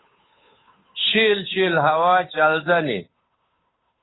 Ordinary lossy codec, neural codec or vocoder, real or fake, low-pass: AAC, 16 kbps; codec, 16 kHz, 8 kbps, FunCodec, trained on Chinese and English, 25 frames a second; fake; 7.2 kHz